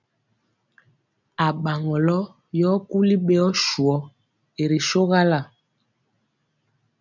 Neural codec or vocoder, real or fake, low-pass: none; real; 7.2 kHz